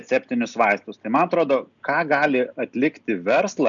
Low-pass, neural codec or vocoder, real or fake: 7.2 kHz; none; real